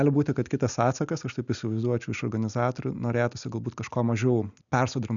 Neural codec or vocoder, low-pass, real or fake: none; 7.2 kHz; real